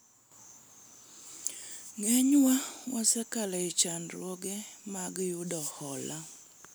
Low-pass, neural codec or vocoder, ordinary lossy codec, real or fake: none; none; none; real